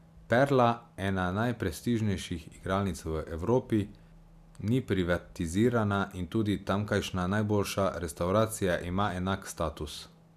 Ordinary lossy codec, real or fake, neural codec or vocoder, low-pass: AAC, 96 kbps; real; none; 14.4 kHz